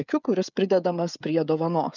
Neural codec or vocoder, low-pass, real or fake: codec, 16 kHz, 16 kbps, FreqCodec, smaller model; 7.2 kHz; fake